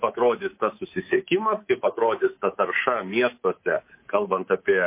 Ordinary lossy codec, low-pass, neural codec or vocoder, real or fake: MP3, 24 kbps; 3.6 kHz; codec, 24 kHz, 3.1 kbps, DualCodec; fake